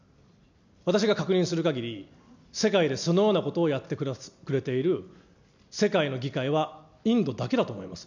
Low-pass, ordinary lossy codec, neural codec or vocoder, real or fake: 7.2 kHz; none; none; real